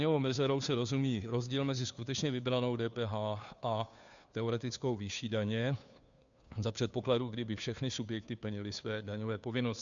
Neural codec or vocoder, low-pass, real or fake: codec, 16 kHz, 2 kbps, FunCodec, trained on Chinese and English, 25 frames a second; 7.2 kHz; fake